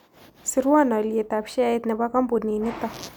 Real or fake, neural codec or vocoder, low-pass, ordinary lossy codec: real; none; none; none